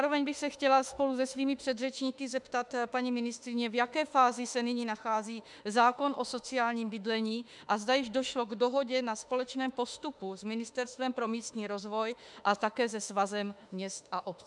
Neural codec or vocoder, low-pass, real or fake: autoencoder, 48 kHz, 32 numbers a frame, DAC-VAE, trained on Japanese speech; 10.8 kHz; fake